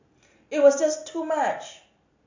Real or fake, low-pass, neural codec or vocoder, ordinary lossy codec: real; 7.2 kHz; none; AAC, 48 kbps